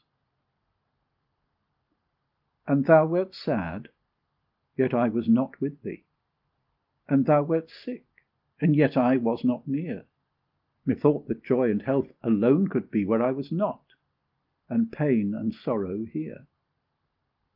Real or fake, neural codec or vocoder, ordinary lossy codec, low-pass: real; none; Opus, 24 kbps; 5.4 kHz